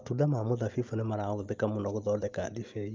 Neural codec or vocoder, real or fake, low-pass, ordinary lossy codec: none; real; 7.2 kHz; Opus, 24 kbps